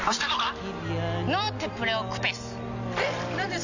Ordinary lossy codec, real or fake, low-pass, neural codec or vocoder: none; real; 7.2 kHz; none